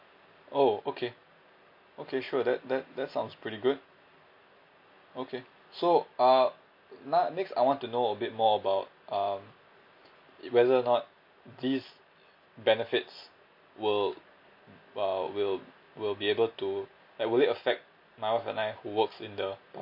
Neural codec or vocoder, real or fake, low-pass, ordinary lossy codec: none; real; 5.4 kHz; MP3, 32 kbps